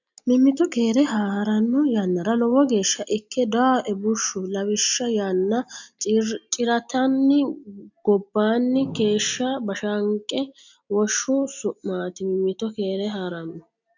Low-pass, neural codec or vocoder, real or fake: 7.2 kHz; none; real